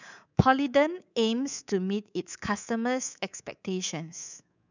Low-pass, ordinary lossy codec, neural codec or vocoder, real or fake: 7.2 kHz; none; codec, 24 kHz, 3.1 kbps, DualCodec; fake